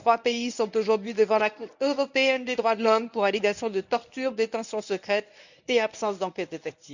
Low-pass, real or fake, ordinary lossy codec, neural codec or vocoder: 7.2 kHz; fake; none; codec, 24 kHz, 0.9 kbps, WavTokenizer, medium speech release version 1